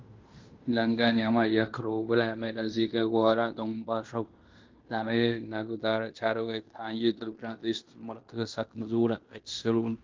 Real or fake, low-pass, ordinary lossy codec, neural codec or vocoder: fake; 7.2 kHz; Opus, 24 kbps; codec, 16 kHz in and 24 kHz out, 0.9 kbps, LongCat-Audio-Codec, fine tuned four codebook decoder